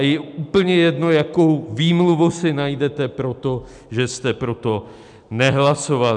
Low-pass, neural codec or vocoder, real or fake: 10.8 kHz; none; real